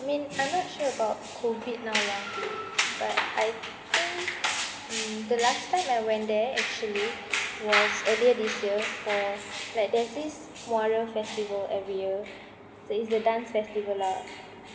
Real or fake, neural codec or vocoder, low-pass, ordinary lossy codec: real; none; none; none